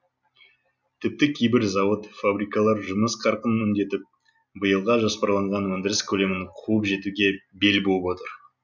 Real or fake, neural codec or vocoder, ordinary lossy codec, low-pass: real; none; none; 7.2 kHz